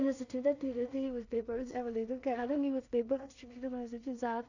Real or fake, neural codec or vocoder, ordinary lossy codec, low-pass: fake; codec, 16 kHz in and 24 kHz out, 0.4 kbps, LongCat-Audio-Codec, two codebook decoder; none; 7.2 kHz